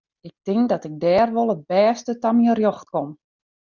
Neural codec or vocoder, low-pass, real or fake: none; 7.2 kHz; real